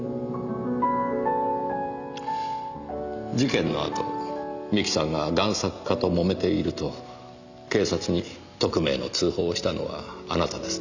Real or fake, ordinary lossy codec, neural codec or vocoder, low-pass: real; Opus, 64 kbps; none; 7.2 kHz